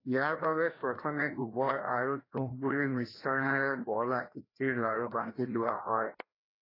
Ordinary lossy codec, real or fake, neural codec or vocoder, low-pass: AAC, 24 kbps; fake; codec, 16 kHz, 1 kbps, FreqCodec, larger model; 5.4 kHz